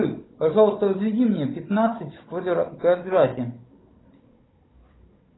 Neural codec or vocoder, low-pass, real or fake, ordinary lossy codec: codec, 16 kHz, 16 kbps, FunCodec, trained on Chinese and English, 50 frames a second; 7.2 kHz; fake; AAC, 16 kbps